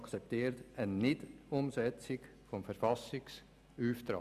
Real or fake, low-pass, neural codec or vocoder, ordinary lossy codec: real; 14.4 kHz; none; none